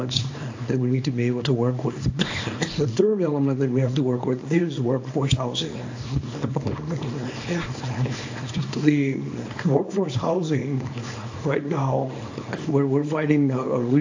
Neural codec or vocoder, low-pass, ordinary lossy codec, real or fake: codec, 24 kHz, 0.9 kbps, WavTokenizer, small release; 7.2 kHz; AAC, 48 kbps; fake